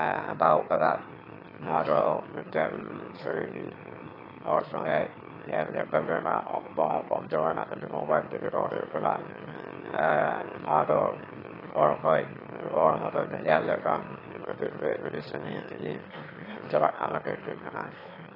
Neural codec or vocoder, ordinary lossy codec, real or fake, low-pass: autoencoder, 22.05 kHz, a latent of 192 numbers a frame, VITS, trained on one speaker; AAC, 24 kbps; fake; 5.4 kHz